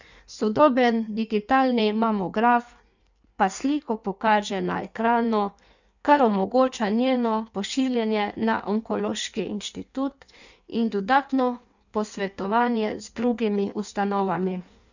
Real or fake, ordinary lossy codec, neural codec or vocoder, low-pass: fake; none; codec, 16 kHz in and 24 kHz out, 1.1 kbps, FireRedTTS-2 codec; 7.2 kHz